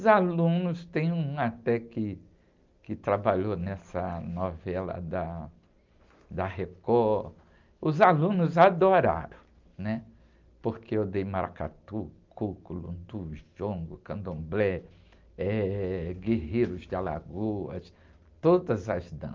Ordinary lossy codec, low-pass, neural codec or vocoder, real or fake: Opus, 24 kbps; 7.2 kHz; none; real